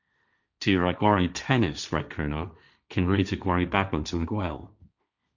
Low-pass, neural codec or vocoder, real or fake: 7.2 kHz; codec, 16 kHz, 1.1 kbps, Voila-Tokenizer; fake